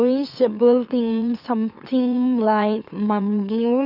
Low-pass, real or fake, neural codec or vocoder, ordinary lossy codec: 5.4 kHz; fake; autoencoder, 44.1 kHz, a latent of 192 numbers a frame, MeloTTS; none